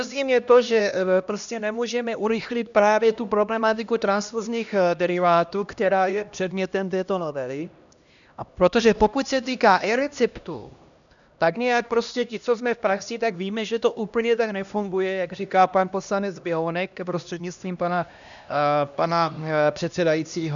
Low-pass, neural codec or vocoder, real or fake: 7.2 kHz; codec, 16 kHz, 1 kbps, X-Codec, HuBERT features, trained on LibriSpeech; fake